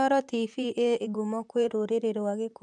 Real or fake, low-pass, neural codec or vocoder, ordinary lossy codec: fake; 10.8 kHz; vocoder, 44.1 kHz, 128 mel bands, Pupu-Vocoder; none